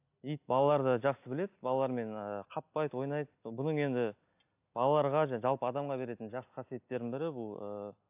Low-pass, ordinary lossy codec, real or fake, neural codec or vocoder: 3.6 kHz; AAC, 32 kbps; real; none